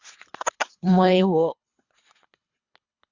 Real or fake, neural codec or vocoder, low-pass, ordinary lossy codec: fake; codec, 24 kHz, 3 kbps, HILCodec; 7.2 kHz; Opus, 64 kbps